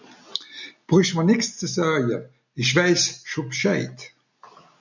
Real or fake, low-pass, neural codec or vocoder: real; 7.2 kHz; none